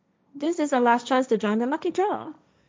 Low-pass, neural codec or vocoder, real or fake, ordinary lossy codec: none; codec, 16 kHz, 1.1 kbps, Voila-Tokenizer; fake; none